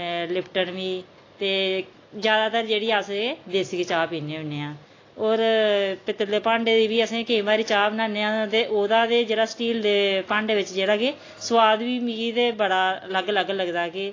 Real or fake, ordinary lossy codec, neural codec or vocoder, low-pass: real; AAC, 32 kbps; none; 7.2 kHz